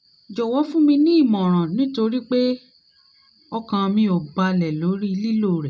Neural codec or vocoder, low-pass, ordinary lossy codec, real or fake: none; none; none; real